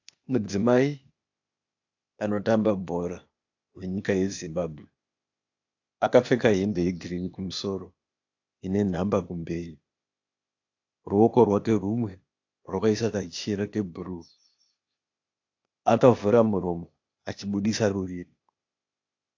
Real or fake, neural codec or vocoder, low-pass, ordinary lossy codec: fake; codec, 16 kHz, 0.8 kbps, ZipCodec; 7.2 kHz; AAC, 48 kbps